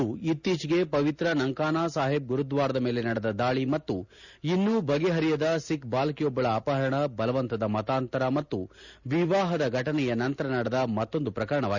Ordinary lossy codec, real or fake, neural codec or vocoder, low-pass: none; real; none; 7.2 kHz